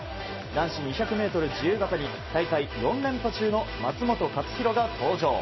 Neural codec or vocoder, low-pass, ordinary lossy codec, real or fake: none; 7.2 kHz; MP3, 24 kbps; real